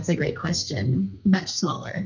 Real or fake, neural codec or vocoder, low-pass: fake; codec, 32 kHz, 1.9 kbps, SNAC; 7.2 kHz